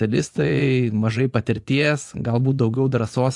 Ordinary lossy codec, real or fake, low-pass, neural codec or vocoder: AAC, 64 kbps; fake; 10.8 kHz; vocoder, 24 kHz, 100 mel bands, Vocos